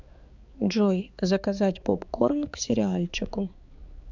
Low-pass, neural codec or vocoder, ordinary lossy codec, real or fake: 7.2 kHz; codec, 16 kHz, 4 kbps, X-Codec, HuBERT features, trained on balanced general audio; Opus, 64 kbps; fake